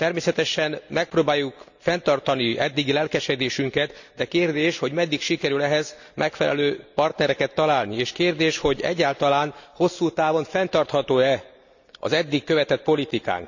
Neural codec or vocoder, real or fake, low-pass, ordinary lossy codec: none; real; 7.2 kHz; none